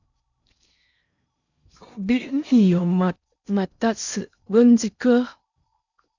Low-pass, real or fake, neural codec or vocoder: 7.2 kHz; fake; codec, 16 kHz in and 24 kHz out, 0.6 kbps, FocalCodec, streaming, 4096 codes